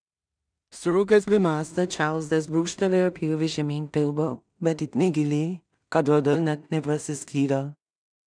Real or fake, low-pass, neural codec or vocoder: fake; 9.9 kHz; codec, 16 kHz in and 24 kHz out, 0.4 kbps, LongCat-Audio-Codec, two codebook decoder